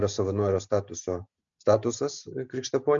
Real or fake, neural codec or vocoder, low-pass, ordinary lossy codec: real; none; 7.2 kHz; MP3, 64 kbps